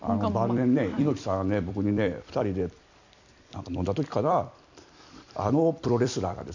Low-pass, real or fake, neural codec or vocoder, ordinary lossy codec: 7.2 kHz; fake; vocoder, 44.1 kHz, 128 mel bands every 256 samples, BigVGAN v2; none